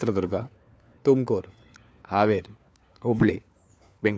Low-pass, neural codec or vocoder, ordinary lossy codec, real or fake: none; codec, 16 kHz, 4 kbps, FunCodec, trained on LibriTTS, 50 frames a second; none; fake